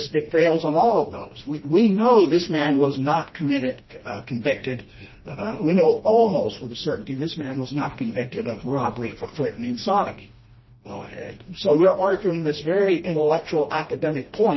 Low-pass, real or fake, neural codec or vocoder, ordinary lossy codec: 7.2 kHz; fake; codec, 16 kHz, 1 kbps, FreqCodec, smaller model; MP3, 24 kbps